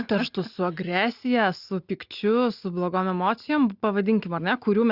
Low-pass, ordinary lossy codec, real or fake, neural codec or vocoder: 5.4 kHz; Opus, 64 kbps; real; none